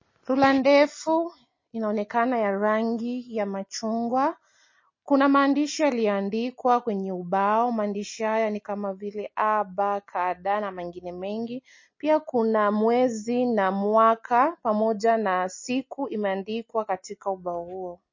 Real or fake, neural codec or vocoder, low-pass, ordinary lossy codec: real; none; 7.2 kHz; MP3, 32 kbps